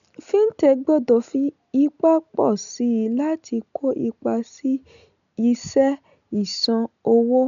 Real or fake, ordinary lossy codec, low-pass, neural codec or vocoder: real; none; 7.2 kHz; none